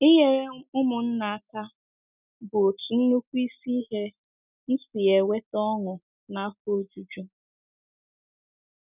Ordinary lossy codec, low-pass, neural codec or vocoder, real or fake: none; 3.6 kHz; none; real